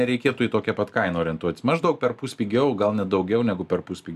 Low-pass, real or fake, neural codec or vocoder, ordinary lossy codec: 14.4 kHz; real; none; AAC, 96 kbps